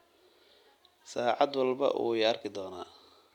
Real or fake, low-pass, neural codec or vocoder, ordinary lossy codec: real; 19.8 kHz; none; none